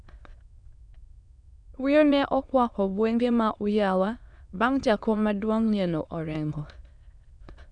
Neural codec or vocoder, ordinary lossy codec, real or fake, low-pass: autoencoder, 22.05 kHz, a latent of 192 numbers a frame, VITS, trained on many speakers; none; fake; 9.9 kHz